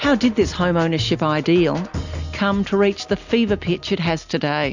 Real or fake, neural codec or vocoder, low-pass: real; none; 7.2 kHz